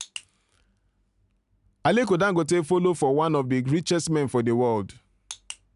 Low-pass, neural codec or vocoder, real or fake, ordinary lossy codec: 10.8 kHz; none; real; none